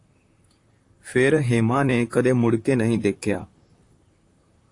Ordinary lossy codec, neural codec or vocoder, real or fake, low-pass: AAC, 48 kbps; vocoder, 44.1 kHz, 128 mel bands, Pupu-Vocoder; fake; 10.8 kHz